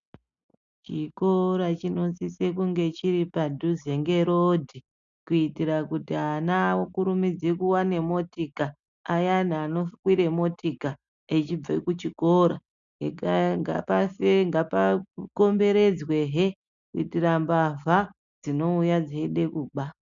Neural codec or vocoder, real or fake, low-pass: none; real; 7.2 kHz